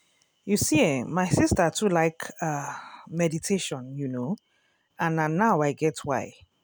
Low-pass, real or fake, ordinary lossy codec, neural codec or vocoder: none; real; none; none